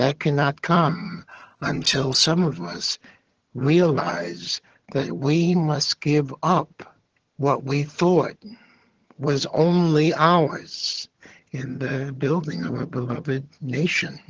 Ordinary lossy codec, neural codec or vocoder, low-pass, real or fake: Opus, 16 kbps; vocoder, 22.05 kHz, 80 mel bands, HiFi-GAN; 7.2 kHz; fake